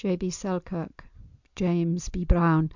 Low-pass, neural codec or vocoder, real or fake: 7.2 kHz; none; real